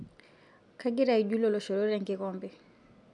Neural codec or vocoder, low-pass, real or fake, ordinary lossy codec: none; 10.8 kHz; real; none